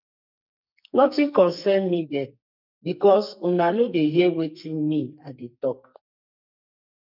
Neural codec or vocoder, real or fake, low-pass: codec, 44.1 kHz, 2.6 kbps, SNAC; fake; 5.4 kHz